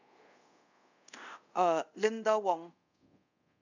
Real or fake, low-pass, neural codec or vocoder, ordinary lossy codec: fake; 7.2 kHz; codec, 24 kHz, 0.5 kbps, DualCodec; none